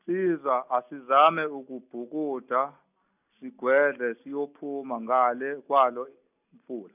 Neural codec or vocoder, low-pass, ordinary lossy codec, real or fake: none; 3.6 kHz; none; real